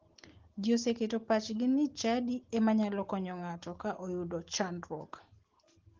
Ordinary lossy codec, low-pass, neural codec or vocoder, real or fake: Opus, 16 kbps; 7.2 kHz; none; real